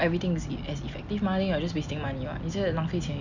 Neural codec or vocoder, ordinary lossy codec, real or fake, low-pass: none; none; real; 7.2 kHz